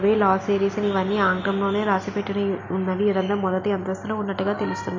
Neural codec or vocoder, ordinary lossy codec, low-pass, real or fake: none; MP3, 64 kbps; 7.2 kHz; real